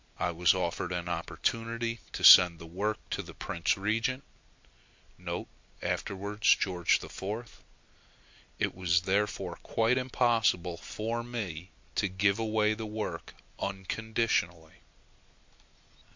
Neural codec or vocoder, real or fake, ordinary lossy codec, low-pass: none; real; MP3, 48 kbps; 7.2 kHz